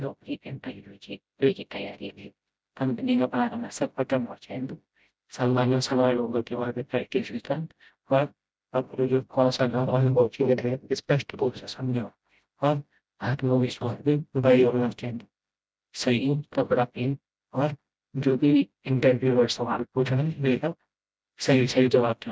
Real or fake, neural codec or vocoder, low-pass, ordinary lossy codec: fake; codec, 16 kHz, 0.5 kbps, FreqCodec, smaller model; none; none